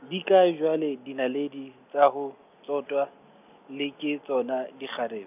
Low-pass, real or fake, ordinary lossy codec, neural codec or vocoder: 3.6 kHz; real; none; none